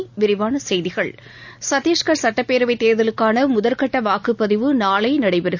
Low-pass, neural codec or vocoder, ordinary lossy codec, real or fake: 7.2 kHz; none; none; real